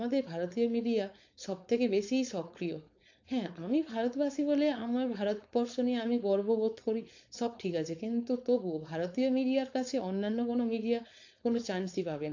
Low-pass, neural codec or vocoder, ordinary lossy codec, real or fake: 7.2 kHz; codec, 16 kHz, 4.8 kbps, FACodec; AAC, 48 kbps; fake